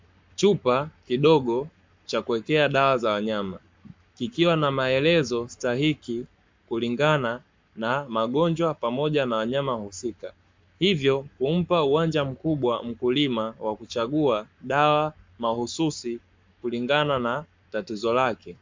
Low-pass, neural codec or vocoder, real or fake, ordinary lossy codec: 7.2 kHz; codec, 44.1 kHz, 7.8 kbps, Pupu-Codec; fake; MP3, 64 kbps